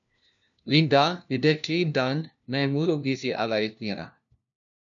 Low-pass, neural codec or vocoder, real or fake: 7.2 kHz; codec, 16 kHz, 1 kbps, FunCodec, trained on LibriTTS, 50 frames a second; fake